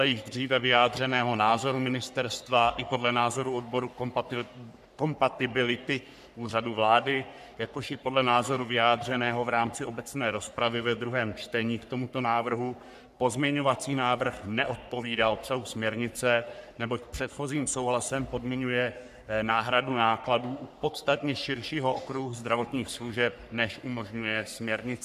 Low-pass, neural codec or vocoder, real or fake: 14.4 kHz; codec, 44.1 kHz, 3.4 kbps, Pupu-Codec; fake